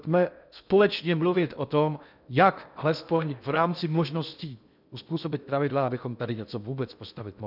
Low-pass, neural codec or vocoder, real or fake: 5.4 kHz; codec, 16 kHz in and 24 kHz out, 0.6 kbps, FocalCodec, streaming, 2048 codes; fake